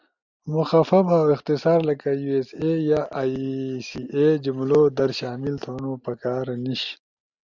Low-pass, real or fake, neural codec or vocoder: 7.2 kHz; real; none